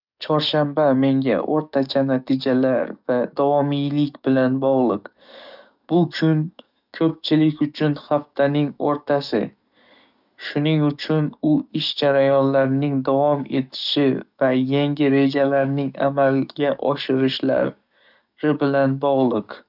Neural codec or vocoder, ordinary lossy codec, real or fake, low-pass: codec, 44.1 kHz, 7.8 kbps, DAC; none; fake; 5.4 kHz